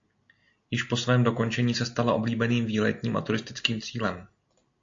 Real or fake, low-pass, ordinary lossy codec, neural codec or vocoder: real; 7.2 kHz; AAC, 48 kbps; none